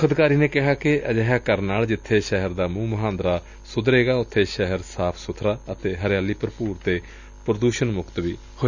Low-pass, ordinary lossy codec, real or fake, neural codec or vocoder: 7.2 kHz; none; real; none